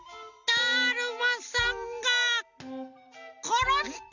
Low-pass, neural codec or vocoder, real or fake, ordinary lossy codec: 7.2 kHz; none; real; none